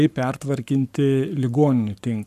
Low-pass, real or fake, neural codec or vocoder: 14.4 kHz; fake; codec, 44.1 kHz, 7.8 kbps, Pupu-Codec